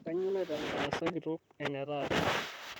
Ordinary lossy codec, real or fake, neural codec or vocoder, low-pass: none; fake; vocoder, 44.1 kHz, 128 mel bands, Pupu-Vocoder; none